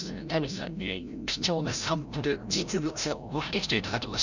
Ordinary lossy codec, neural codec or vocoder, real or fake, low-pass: none; codec, 16 kHz, 0.5 kbps, FreqCodec, larger model; fake; 7.2 kHz